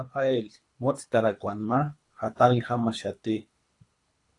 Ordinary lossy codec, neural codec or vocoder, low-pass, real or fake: AAC, 48 kbps; codec, 24 kHz, 3 kbps, HILCodec; 10.8 kHz; fake